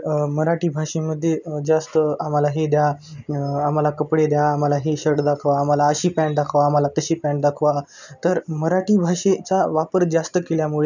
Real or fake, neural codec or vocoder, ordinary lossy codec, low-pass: real; none; none; 7.2 kHz